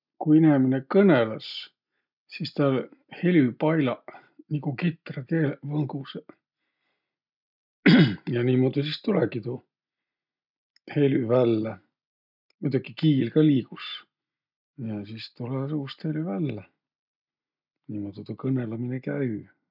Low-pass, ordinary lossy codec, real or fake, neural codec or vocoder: 5.4 kHz; none; real; none